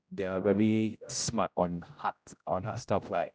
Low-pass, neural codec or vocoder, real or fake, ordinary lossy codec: none; codec, 16 kHz, 0.5 kbps, X-Codec, HuBERT features, trained on general audio; fake; none